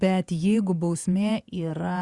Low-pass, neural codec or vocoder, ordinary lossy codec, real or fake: 10.8 kHz; vocoder, 48 kHz, 128 mel bands, Vocos; Opus, 64 kbps; fake